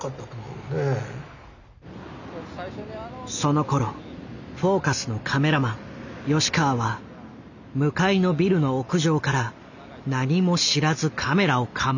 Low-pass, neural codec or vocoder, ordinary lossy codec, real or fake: 7.2 kHz; none; none; real